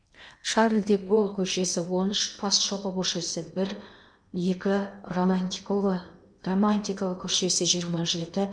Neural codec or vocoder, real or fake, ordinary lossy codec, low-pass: codec, 16 kHz in and 24 kHz out, 0.8 kbps, FocalCodec, streaming, 65536 codes; fake; none; 9.9 kHz